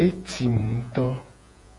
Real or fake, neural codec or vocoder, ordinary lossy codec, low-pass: fake; vocoder, 48 kHz, 128 mel bands, Vocos; MP3, 48 kbps; 10.8 kHz